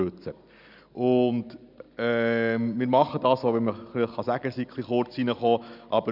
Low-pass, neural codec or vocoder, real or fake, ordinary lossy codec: 5.4 kHz; none; real; none